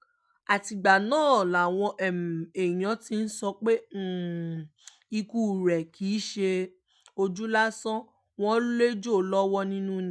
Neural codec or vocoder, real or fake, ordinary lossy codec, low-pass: none; real; none; none